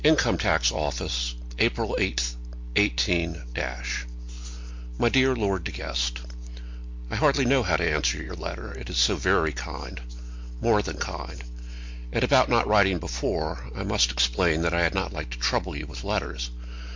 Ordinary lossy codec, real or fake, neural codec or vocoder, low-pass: MP3, 48 kbps; real; none; 7.2 kHz